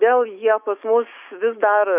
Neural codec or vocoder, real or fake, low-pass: autoencoder, 48 kHz, 128 numbers a frame, DAC-VAE, trained on Japanese speech; fake; 3.6 kHz